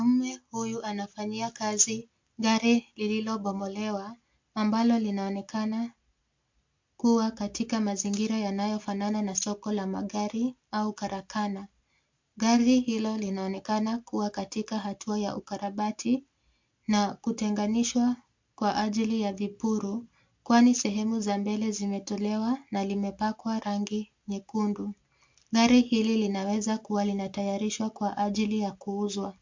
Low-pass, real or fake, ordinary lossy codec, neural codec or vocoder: 7.2 kHz; real; MP3, 48 kbps; none